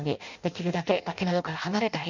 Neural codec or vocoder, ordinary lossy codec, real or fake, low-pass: codec, 16 kHz in and 24 kHz out, 0.6 kbps, FireRedTTS-2 codec; none; fake; 7.2 kHz